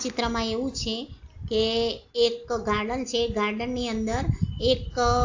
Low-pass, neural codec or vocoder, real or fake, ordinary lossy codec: 7.2 kHz; none; real; AAC, 48 kbps